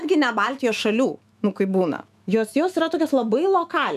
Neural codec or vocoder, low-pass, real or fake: autoencoder, 48 kHz, 128 numbers a frame, DAC-VAE, trained on Japanese speech; 14.4 kHz; fake